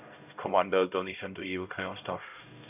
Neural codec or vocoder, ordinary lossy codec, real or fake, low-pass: codec, 16 kHz, 0.5 kbps, X-Codec, HuBERT features, trained on LibriSpeech; none; fake; 3.6 kHz